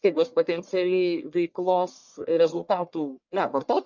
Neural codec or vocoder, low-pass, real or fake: codec, 44.1 kHz, 1.7 kbps, Pupu-Codec; 7.2 kHz; fake